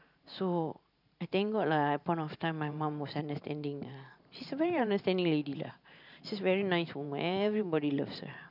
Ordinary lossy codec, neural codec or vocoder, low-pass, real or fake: none; vocoder, 44.1 kHz, 128 mel bands every 512 samples, BigVGAN v2; 5.4 kHz; fake